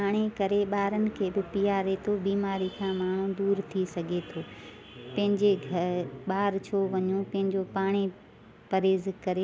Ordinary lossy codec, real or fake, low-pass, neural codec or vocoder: none; real; none; none